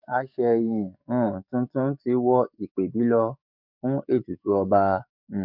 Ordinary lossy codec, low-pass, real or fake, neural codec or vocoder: none; 5.4 kHz; fake; codec, 44.1 kHz, 7.8 kbps, DAC